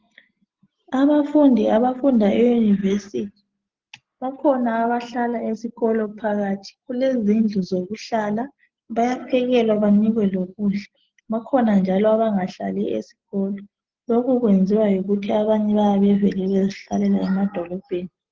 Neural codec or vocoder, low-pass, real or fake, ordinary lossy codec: none; 7.2 kHz; real; Opus, 16 kbps